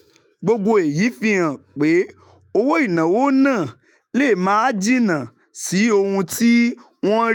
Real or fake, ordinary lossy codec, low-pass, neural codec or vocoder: fake; none; 19.8 kHz; autoencoder, 48 kHz, 128 numbers a frame, DAC-VAE, trained on Japanese speech